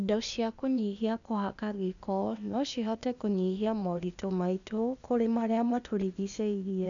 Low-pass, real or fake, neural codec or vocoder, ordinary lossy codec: 7.2 kHz; fake; codec, 16 kHz, 0.8 kbps, ZipCodec; none